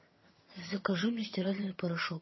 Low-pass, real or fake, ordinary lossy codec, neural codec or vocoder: 7.2 kHz; fake; MP3, 24 kbps; vocoder, 22.05 kHz, 80 mel bands, HiFi-GAN